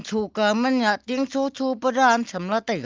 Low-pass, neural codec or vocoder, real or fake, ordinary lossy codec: 7.2 kHz; none; real; Opus, 24 kbps